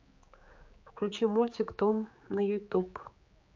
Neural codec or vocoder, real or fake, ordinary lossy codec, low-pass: codec, 16 kHz, 4 kbps, X-Codec, HuBERT features, trained on general audio; fake; MP3, 48 kbps; 7.2 kHz